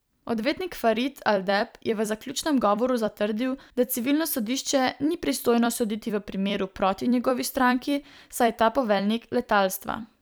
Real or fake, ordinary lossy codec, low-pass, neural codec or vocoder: fake; none; none; vocoder, 44.1 kHz, 128 mel bands every 256 samples, BigVGAN v2